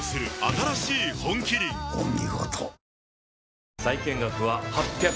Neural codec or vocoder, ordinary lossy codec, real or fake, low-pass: none; none; real; none